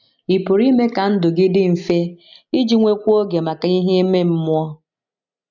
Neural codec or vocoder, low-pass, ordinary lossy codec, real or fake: none; 7.2 kHz; none; real